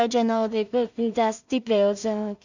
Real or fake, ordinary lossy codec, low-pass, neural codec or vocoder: fake; none; 7.2 kHz; codec, 16 kHz in and 24 kHz out, 0.4 kbps, LongCat-Audio-Codec, two codebook decoder